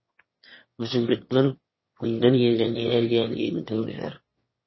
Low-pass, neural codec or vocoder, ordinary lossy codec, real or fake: 7.2 kHz; autoencoder, 22.05 kHz, a latent of 192 numbers a frame, VITS, trained on one speaker; MP3, 24 kbps; fake